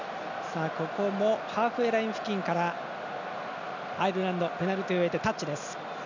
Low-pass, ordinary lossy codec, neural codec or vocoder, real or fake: 7.2 kHz; none; none; real